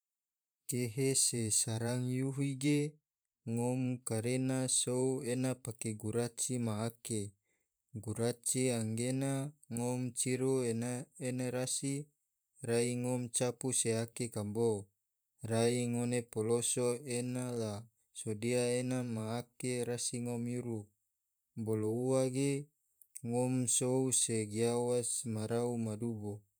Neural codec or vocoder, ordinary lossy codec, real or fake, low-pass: vocoder, 44.1 kHz, 128 mel bands every 512 samples, BigVGAN v2; none; fake; none